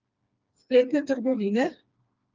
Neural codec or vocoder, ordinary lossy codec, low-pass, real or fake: codec, 16 kHz, 2 kbps, FreqCodec, smaller model; Opus, 32 kbps; 7.2 kHz; fake